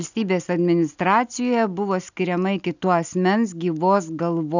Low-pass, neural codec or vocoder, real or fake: 7.2 kHz; none; real